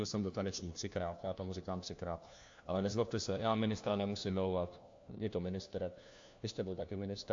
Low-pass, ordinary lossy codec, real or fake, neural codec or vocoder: 7.2 kHz; AAC, 48 kbps; fake; codec, 16 kHz, 1 kbps, FunCodec, trained on LibriTTS, 50 frames a second